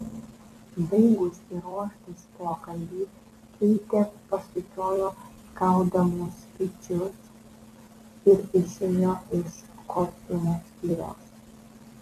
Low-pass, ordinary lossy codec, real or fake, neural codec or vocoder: 14.4 kHz; MP3, 96 kbps; fake; vocoder, 44.1 kHz, 128 mel bands every 256 samples, BigVGAN v2